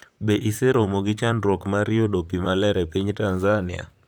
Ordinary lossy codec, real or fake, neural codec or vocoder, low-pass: none; fake; vocoder, 44.1 kHz, 128 mel bands, Pupu-Vocoder; none